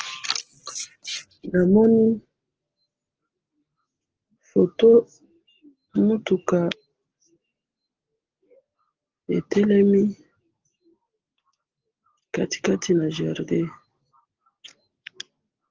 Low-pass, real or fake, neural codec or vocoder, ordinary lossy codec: 7.2 kHz; real; none; Opus, 16 kbps